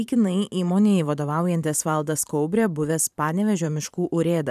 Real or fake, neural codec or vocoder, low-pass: fake; vocoder, 44.1 kHz, 128 mel bands every 512 samples, BigVGAN v2; 14.4 kHz